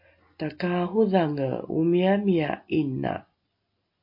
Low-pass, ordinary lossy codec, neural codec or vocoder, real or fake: 5.4 kHz; MP3, 32 kbps; none; real